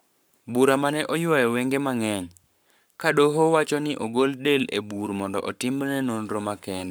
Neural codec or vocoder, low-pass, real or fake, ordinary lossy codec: codec, 44.1 kHz, 7.8 kbps, Pupu-Codec; none; fake; none